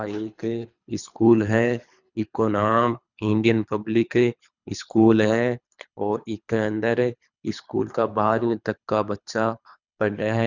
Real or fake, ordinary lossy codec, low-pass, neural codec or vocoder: fake; none; 7.2 kHz; codec, 24 kHz, 3 kbps, HILCodec